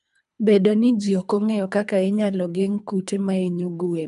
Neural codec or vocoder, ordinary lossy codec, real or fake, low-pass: codec, 24 kHz, 3 kbps, HILCodec; none; fake; 10.8 kHz